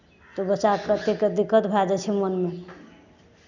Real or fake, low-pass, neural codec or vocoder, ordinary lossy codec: real; 7.2 kHz; none; none